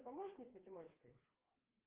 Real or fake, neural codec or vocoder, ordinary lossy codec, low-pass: fake; codec, 16 kHz, 4 kbps, FreqCodec, smaller model; Opus, 16 kbps; 3.6 kHz